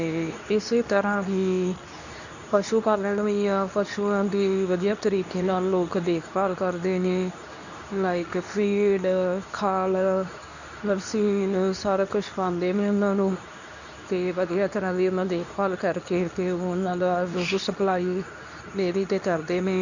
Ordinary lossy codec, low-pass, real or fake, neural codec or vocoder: none; 7.2 kHz; fake; codec, 24 kHz, 0.9 kbps, WavTokenizer, medium speech release version 2